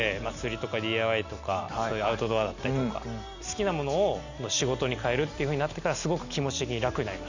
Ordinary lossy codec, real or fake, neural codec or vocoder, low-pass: none; real; none; 7.2 kHz